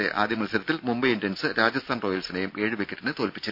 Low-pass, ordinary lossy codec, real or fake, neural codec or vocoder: 5.4 kHz; none; real; none